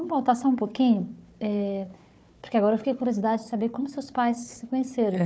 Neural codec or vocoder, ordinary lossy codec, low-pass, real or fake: codec, 16 kHz, 4 kbps, FunCodec, trained on Chinese and English, 50 frames a second; none; none; fake